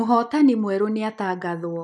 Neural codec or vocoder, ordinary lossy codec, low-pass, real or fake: none; none; none; real